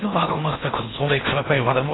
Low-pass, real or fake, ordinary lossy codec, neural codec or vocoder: 7.2 kHz; fake; AAC, 16 kbps; codec, 16 kHz in and 24 kHz out, 0.6 kbps, FocalCodec, streaming, 4096 codes